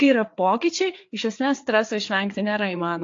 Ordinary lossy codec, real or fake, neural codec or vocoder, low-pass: AAC, 48 kbps; fake; codec, 16 kHz, 4 kbps, FreqCodec, larger model; 7.2 kHz